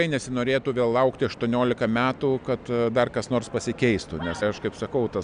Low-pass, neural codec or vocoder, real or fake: 9.9 kHz; none; real